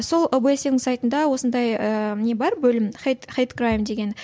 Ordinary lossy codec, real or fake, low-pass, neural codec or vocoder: none; real; none; none